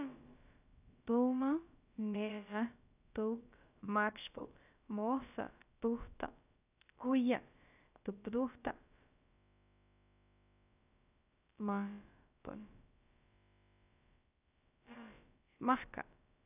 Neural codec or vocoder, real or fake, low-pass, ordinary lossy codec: codec, 16 kHz, about 1 kbps, DyCAST, with the encoder's durations; fake; 3.6 kHz; none